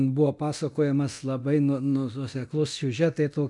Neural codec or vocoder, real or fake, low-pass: codec, 24 kHz, 0.9 kbps, DualCodec; fake; 10.8 kHz